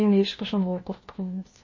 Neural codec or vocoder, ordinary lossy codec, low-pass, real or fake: codec, 16 kHz, 1 kbps, FunCodec, trained on LibriTTS, 50 frames a second; MP3, 32 kbps; 7.2 kHz; fake